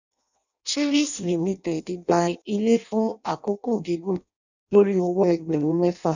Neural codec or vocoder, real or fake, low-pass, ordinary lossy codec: codec, 16 kHz in and 24 kHz out, 0.6 kbps, FireRedTTS-2 codec; fake; 7.2 kHz; none